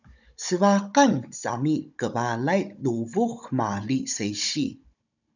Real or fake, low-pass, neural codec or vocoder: fake; 7.2 kHz; codec, 16 kHz, 16 kbps, FunCodec, trained on Chinese and English, 50 frames a second